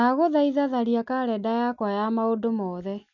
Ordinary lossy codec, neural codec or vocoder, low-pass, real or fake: none; none; 7.2 kHz; real